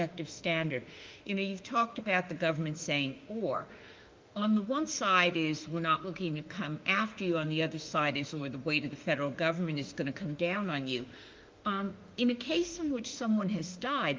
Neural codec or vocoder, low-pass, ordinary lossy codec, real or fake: autoencoder, 48 kHz, 32 numbers a frame, DAC-VAE, trained on Japanese speech; 7.2 kHz; Opus, 32 kbps; fake